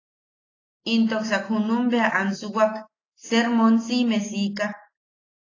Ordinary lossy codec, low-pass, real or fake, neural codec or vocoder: AAC, 32 kbps; 7.2 kHz; real; none